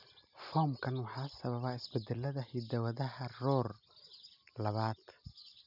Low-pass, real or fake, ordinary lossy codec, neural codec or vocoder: 5.4 kHz; real; none; none